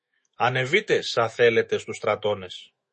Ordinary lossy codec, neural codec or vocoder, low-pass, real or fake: MP3, 32 kbps; autoencoder, 48 kHz, 128 numbers a frame, DAC-VAE, trained on Japanese speech; 10.8 kHz; fake